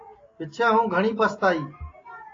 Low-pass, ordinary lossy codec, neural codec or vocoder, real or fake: 7.2 kHz; AAC, 32 kbps; none; real